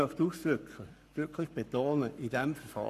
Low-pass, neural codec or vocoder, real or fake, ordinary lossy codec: 14.4 kHz; codec, 44.1 kHz, 7.8 kbps, Pupu-Codec; fake; none